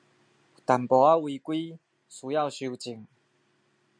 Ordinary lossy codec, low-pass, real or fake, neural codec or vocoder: MP3, 64 kbps; 9.9 kHz; real; none